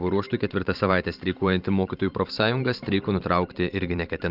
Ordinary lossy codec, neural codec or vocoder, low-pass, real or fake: Opus, 32 kbps; vocoder, 44.1 kHz, 128 mel bands every 512 samples, BigVGAN v2; 5.4 kHz; fake